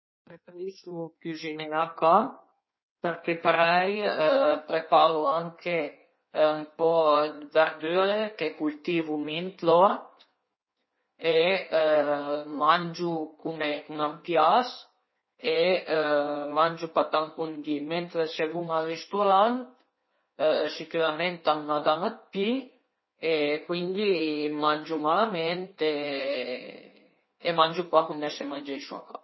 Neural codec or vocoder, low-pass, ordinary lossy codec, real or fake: codec, 16 kHz in and 24 kHz out, 1.1 kbps, FireRedTTS-2 codec; 7.2 kHz; MP3, 24 kbps; fake